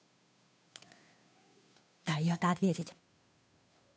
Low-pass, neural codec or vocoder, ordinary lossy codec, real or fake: none; codec, 16 kHz, 2 kbps, FunCodec, trained on Chinese and English, 25 frames a second; none; fake